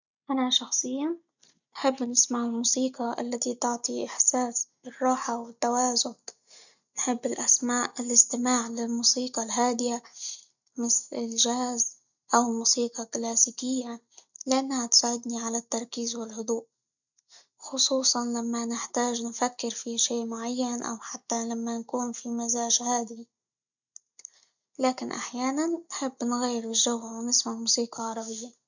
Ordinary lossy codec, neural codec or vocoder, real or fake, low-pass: none; none; real; 7.2 kHz